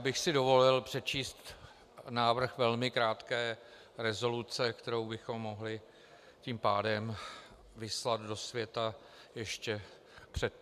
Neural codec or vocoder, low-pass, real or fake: none; 14.4 kHz; real